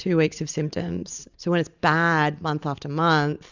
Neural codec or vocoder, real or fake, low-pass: none; real; 7.2 kHz